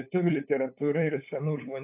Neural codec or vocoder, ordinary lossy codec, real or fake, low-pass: codec, 16 kHz, 8 kbps, FunCodec, trained on LibriTTS, 25 frames a second; AAC, 32 kbps; fake; 3.6 kHz